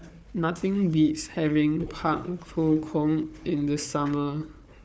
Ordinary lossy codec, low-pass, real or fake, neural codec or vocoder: none; none; fake; codec, 16 kHz, 4 kbps, FunCodec, trained on Chinese and English, 50 frames a second